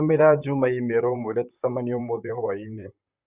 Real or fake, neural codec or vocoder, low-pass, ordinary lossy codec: fake; vocoder, 44.1 kHz, 128 mel bands, Pupu-Vocoder; 3.6 kHz; Opus, 64 kbps